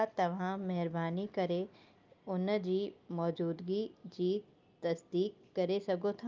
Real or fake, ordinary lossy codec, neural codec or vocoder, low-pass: real; Opus, 24 kbps; none; 7.2 kHz